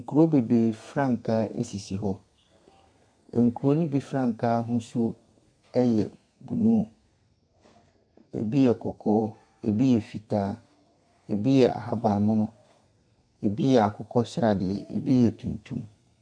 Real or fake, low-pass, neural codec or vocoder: fake; 9.9 kHz; codec, 32 kHz, 1.9 kbps, SNAC